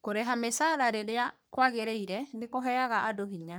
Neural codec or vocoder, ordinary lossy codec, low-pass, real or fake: codec, 44.1 kHz, 3.4 kbps, Pupu-Codec; none; none; fake